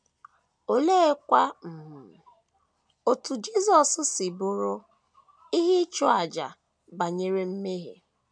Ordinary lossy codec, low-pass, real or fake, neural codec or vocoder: none; 9.9 kHz; real; none